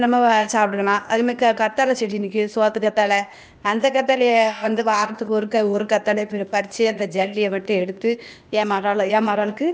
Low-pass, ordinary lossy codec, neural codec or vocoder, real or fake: none; none; codec, 16 kHz, 0.8 kbps, ZipCodec; fake